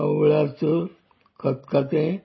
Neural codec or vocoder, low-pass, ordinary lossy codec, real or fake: none; 7.2 kHz; MP3, 24 kbps; real